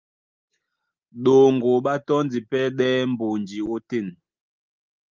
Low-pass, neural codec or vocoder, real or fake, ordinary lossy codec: 7.2 kHz; none; real; Opus, 32 kbps